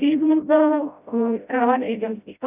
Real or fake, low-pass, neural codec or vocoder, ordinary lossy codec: fake; 3.6 kHz; codec, 16 kHz, 0.5 kbps, FreqCodec, smaller model; none